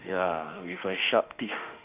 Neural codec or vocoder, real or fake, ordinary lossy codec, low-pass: autoencoder, 48 kHz, 32 numbers a frame, DAC-VAE, trained on Japanese speech; fake; Opus, 24 kbps; 3.6 kHz